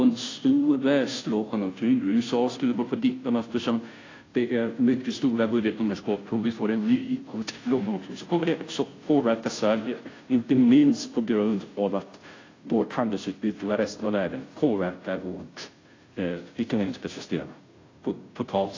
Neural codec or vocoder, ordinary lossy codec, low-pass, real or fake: codec, 16 kHz, 0.5 kbps, FunCodec, trained on Chinese and English, 25 frames a second; AAC, 32 kbps; 7.2 kHz; fake